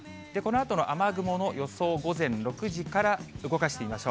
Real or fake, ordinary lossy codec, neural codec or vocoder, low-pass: real; none; none; none